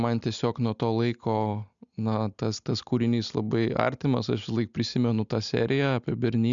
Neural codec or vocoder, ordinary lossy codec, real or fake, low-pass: none; MP3, 96 kbps; real; 7.2 kHz